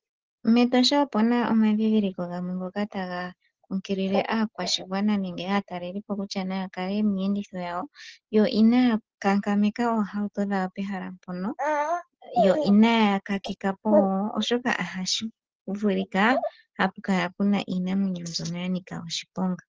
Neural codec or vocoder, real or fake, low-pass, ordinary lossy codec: none; real; 7.2 kHz; Opus, 16 kbps